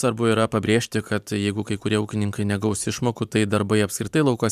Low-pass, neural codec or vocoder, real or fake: 14.4 kHz; vocoder, 44.1 kHz, 128 mel bands every 512 samples, BigVGAN v2; fake